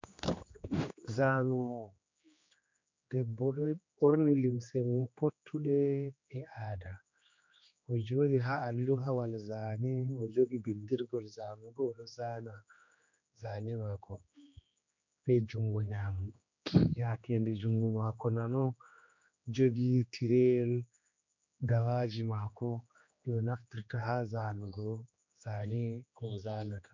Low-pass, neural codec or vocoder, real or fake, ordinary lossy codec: 7.2 kHz; codec, 16 kHz, 2 kbps, X-Codec, HuBERT features, trained on general audio; fake; MP3, 48 kbps